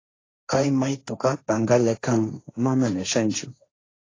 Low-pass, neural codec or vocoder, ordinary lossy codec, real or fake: 7.2 kHz; codec, 16 kHz, 1.1 kbps, Voila-Tokenizer; AAC, 32 kbps; fake